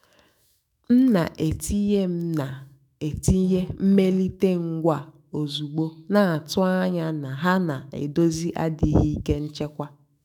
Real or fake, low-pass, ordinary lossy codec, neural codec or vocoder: fake; 19.8 kHz; none; autoencoder, 48 kHz, 128 numbers a frame, DAC-VAE, trained on Japanese speech